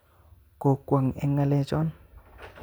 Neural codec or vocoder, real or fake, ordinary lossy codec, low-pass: none; real; none; none